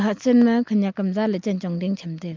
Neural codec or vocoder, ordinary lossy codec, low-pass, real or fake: none; Opus, 32 kbps; 7.2 kHz; real